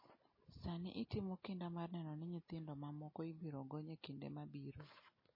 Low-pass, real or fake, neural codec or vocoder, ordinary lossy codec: 5.4 kHz; real; none; MP3, 24 kbps